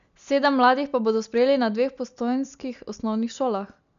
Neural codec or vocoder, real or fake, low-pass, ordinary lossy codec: none; real; 7.2 kHz; none